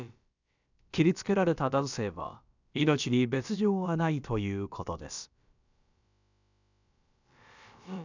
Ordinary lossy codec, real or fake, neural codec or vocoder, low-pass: none; fake; codec, 16 kHz, about 1 kbps, DyCAST, with the encoder's durations; 7.2 kHz